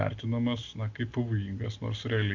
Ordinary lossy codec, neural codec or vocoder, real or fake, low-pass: MP3, 64 kbps; none; real; 7.2 kHz